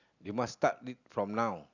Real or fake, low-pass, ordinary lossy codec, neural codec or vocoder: real; 7.2 kHz; none; none